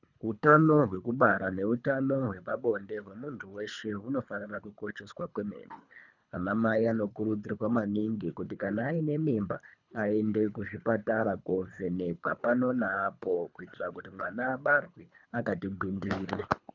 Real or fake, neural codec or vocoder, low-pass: fake; codec, 24 kHz, 3 kbps, HILCodec; 7.2 kHz